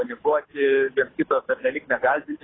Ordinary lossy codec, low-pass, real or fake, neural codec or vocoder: AAC, 16 kbps; 7.2 kHz; fake; codec, 24 kHz, 6 kbps, HILCodec